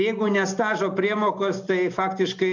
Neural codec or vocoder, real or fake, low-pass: none; real; 7.2 kHz